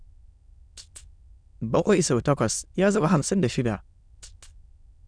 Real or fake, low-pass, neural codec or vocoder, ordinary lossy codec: fake; 9.9 kHz; autoencoder, 22.05 kHz, a latent of 192 numbers a frame, VITS, trained on many speakers; none